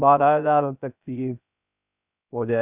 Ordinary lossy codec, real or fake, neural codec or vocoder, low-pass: none; fake; codec, 16 kHz, 0.3 kbps, FocalCodec; 3.6 kHz